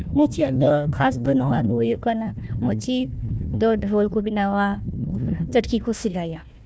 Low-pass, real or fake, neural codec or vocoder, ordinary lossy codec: none; fake; codec, 16 kHz, 1 kbps, FunCodec, trained on Chinese and English, 50 frames a second; none